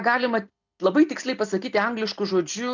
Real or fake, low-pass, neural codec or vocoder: real; 7.2 kHz; none